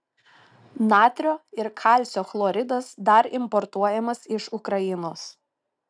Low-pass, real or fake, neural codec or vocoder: 9.9 kHz; real; none